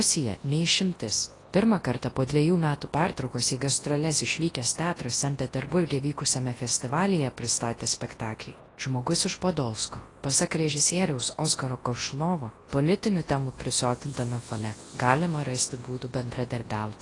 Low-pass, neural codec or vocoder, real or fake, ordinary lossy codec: 10.8 kHz; codec, 24 kHz, 0.9 kbps, WavTokenizer, large speech release; fake; AAC, 32 kbps